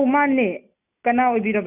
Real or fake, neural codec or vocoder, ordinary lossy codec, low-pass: real; none; none; 3.6 kHz